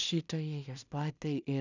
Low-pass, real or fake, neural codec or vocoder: 7.2 kHz; fake; codec, 16 kHz in and 24 kHz out, 0.4 kbps, LongCat-Audio-Codec, two codebook decoder